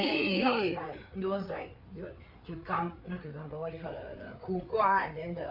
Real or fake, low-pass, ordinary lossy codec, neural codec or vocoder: fake; 5.4 kHz; none; codec, 16 kHz, 4 kbps, FreqCodec, larger model